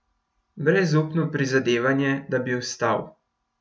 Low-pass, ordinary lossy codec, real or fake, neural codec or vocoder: none; none; real; none